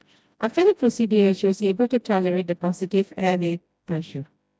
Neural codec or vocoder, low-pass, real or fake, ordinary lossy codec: codec, 16 kHz, 1 kbps, FreqCodec, smaller model; none; fake; none